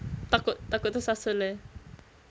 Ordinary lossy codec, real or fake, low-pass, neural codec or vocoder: none; real; none; none